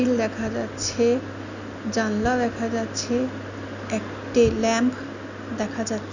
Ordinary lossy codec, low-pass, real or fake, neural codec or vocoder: none; 7.2 kHz; real; none